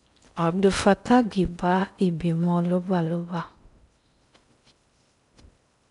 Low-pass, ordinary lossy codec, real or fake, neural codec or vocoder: 10.8 kHz; none; fake; codec, 16 kHz in and 24 kHz out, 0.6 kbps, FocalCodec, streaming, 4096 codes